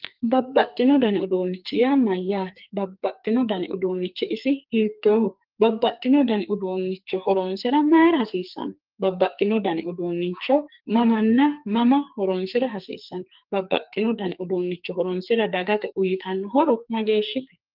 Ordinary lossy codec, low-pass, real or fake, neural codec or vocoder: Opus, 32 kbps; 5.4 kHz; fake; codec, 44.1 kHz, 2.6 kbps, SNAC